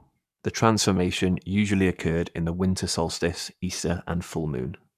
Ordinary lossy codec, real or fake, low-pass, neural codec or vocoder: none; fake; 14.4 kHz; codec, 44.1 kHz, 7.8 kbps, Pupu-Codec